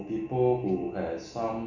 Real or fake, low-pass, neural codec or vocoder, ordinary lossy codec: real; 7.2 kHz; none; none